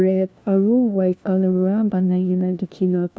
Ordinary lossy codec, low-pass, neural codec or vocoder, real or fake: none; none; codec, 16 kHz, 1 kbps, FunCodec, trained on LibriTTS, 50 frames a second; fake